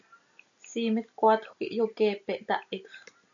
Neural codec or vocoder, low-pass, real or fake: none; 7.2 kHz; real